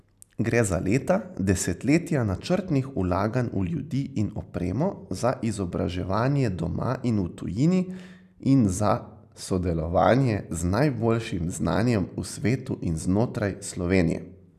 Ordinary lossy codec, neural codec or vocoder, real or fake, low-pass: none; none; real; 14.4 kHz